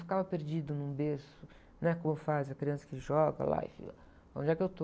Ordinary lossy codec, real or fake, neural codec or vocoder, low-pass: none; real; none; none